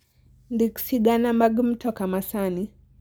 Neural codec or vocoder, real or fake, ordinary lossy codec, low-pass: none; real; none; none